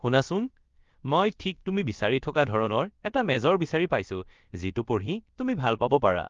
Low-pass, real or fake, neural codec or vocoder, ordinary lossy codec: 7.2 kHz; fake; codec, 16 kHz, about 1 kbps, DyCAST, with the encoder's durations; Opus, 32 kbps